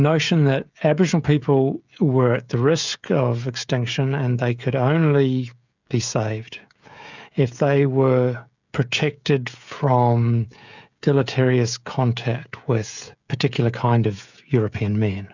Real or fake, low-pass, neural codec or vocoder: fake; 7.2 kHz; codec, 16 kHz, 16 kbps, FreqCodec, smaller model